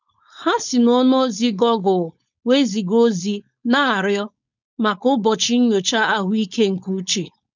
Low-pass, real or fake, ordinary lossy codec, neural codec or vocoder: 7.2 kHz; fake; none; codec, 16 kHz, 4.8 kbps, FACodec